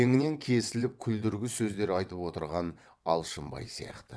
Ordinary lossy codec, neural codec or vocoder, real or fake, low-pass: none; vocoder, 22.05 kHz, 80 mel bands, WaveNeXt; fake; none